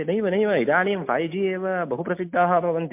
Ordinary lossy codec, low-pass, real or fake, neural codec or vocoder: MP3, 32 kbps; 3.6 kHz; real; none